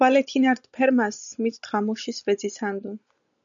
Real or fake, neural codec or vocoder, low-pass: real; none; 7.2 kHz